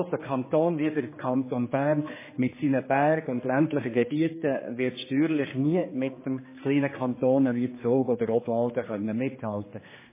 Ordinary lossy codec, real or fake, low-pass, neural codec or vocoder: MP3, 16 kbps; fake; 3.6 kHz; codec, 16 kHz, 2 kbps, X-Codec, HuBERT features, trained on general audio